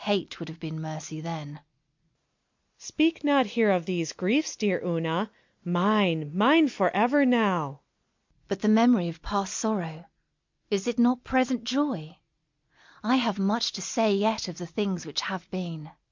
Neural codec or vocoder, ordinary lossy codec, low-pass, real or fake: none; MP3, 64 kbps; 7.2 kHz; real